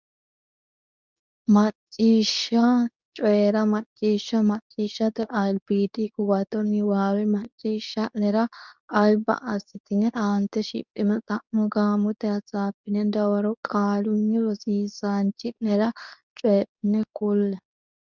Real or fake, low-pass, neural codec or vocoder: fake; 7.2 kHz; codec, 24 kHz, 0.9 kbps, WavTokenizer, medium speech release version 2